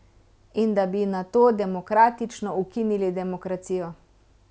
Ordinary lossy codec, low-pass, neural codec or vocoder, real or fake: none; none; none; real